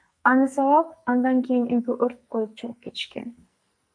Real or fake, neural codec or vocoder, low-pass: fake; codec, 44.1 kHz, 2.6 kbps, SNAC; 9.9 kHz